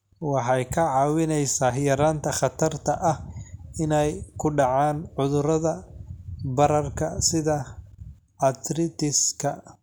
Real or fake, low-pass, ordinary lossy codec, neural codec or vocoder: real; none; none; none